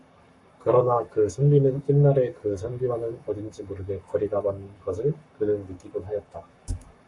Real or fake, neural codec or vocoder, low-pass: fake; codec, 44.1 kHz, 7.8 kbps, Pupu-Codec; 10.8 kHz